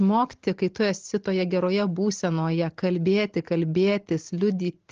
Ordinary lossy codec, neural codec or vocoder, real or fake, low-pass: Opus, 16 kbps; none; real; 7.2 kHz